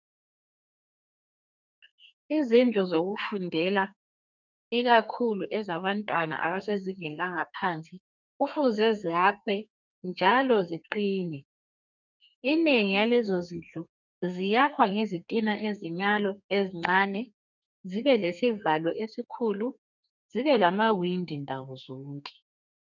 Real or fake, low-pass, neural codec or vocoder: fake; 7.2 kHz; codec, 32 kHz, 1.9 kbps, SNAC